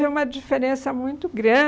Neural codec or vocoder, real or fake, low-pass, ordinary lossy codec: none; real; none; none